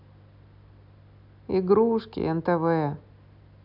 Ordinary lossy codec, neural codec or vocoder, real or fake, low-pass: none; none; real; 5.4 kHz